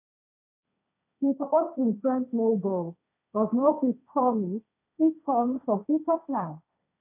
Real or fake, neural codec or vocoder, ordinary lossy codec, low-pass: fake; codec, 16 kHz, 1.1 kbps, Voila-Tokenizer; none; 3.6 kHz